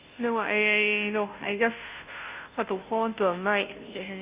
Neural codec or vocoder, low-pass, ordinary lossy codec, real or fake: codec, 16 kHz, 0.5 kbps, FunCodec, trained on Chinese and English, 25 frames a second; 3.6 kHz; Opus, 64 kbps; fake